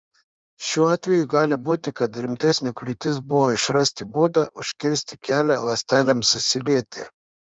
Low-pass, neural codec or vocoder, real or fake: 9.9 kHz; codec, 16 kHz in and 24 kHz out, 1.1 kbps, FireRedTTS-2 codec; fake